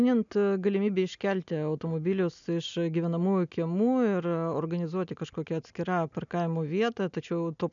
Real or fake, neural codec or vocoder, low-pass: real; none; 7.2 kHz